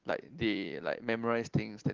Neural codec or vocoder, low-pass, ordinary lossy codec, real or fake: none; 7.2 kHz; Opus, 16 kbps; real